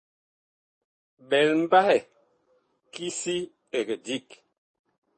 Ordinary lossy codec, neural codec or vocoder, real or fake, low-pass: MP3, 32 kbps; codec, 44.1 kHz, 7.8 kbps, DAC; fake; 10.8 kHz